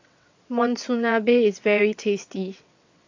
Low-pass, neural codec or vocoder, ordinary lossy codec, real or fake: 7.2 kHz; vocoder, 22.05 kHz, 80 mel bands, WaveNeXt; AAC, 48 kbps; fake